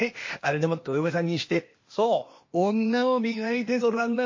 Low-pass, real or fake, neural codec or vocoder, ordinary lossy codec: 7.2 kHz; fake; codec, 16 kHz, 0.8 kbps, ZipCodec; MP3, 32 kbps